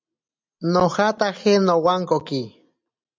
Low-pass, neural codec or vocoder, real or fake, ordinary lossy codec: 7.2 kHz; none; real; MP3, 64 kbps